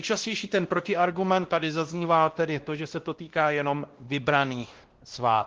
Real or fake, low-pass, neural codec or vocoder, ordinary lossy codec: fake; 7.2 kHz; codec, 16 kHz, 1 kbps, X-Codec, WavLM features, trained on Multilingual LibriSpeech; Opus, 16 kbps